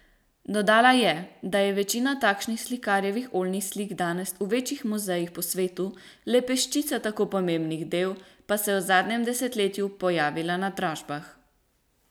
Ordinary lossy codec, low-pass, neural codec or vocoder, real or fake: none; none; none; real